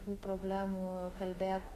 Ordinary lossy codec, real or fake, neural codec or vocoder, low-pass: AAC, 48 kbps; fake; autoencoder, 48 kHz, 32 numbers a frame, DAC-VAE, trained on Japanese speech; 14.4 kHz